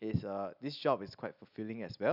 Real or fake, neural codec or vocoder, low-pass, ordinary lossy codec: real; none; 5.4 kHz; none